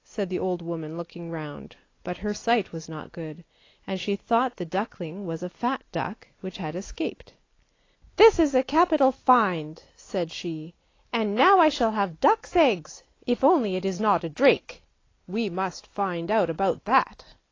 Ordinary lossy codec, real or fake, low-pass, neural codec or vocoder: AAC, 32 kbps; real; 7.2 kHz; none